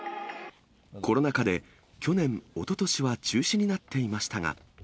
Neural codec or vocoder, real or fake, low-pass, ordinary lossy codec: none; real; none; none